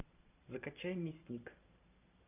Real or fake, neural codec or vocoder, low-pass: fake; vocoder, 44.1 kHz, 128 mel bands every 512 samples, BigVGAN v2; 3.6 kHz